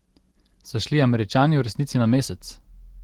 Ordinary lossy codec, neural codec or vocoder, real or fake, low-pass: Opus, 16 kbps; none; real; 19.8 kHz